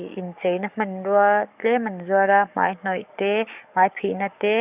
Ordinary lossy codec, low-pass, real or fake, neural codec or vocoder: none; 3.6 kHz; fake; codec, 44.1 kHz, 7.8 kbps, DAC